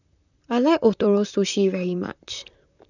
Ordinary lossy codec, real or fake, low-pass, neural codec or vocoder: none; fake; 7.2 kHz; vocoder, 44.1 kHz, 128 mel bands, Pupu-Vocoder